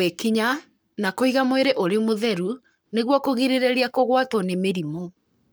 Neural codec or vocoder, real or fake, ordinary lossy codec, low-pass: codec, 44.1 kHz, 7.8 kbps, Pupu-Codec; fake; none; none